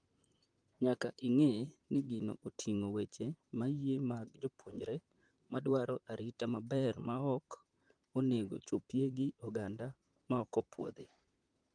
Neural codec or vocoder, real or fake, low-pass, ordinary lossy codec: vocoder, 44.1 kHz, 128 mel bands, Pupu-Vocoder; fake; 9.9 kHz; Opus, 32 kbps